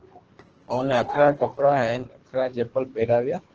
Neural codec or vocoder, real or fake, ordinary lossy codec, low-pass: codec, 24 kHz, 3 kbps, HILCodec; fake; Opus, 16 kbps; 7.2 kHz